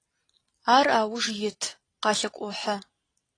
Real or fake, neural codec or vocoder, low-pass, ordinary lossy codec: real; none; 9.9 kHz; AAC, 32 kbps